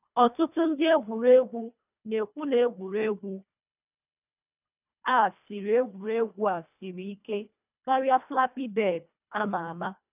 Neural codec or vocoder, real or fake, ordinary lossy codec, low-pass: codec, 24 kHz, 1.5 kbps, HILCodec; fake; none; 3.6 kHz